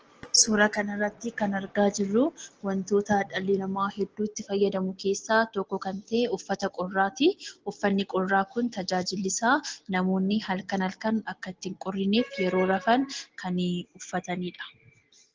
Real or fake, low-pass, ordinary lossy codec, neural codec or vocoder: real; 7.2 kHz; Opus, 16 kbps; none